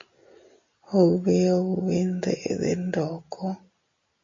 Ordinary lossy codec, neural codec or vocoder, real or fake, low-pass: MP3, 32 kbps; none; real; 7.2 kHz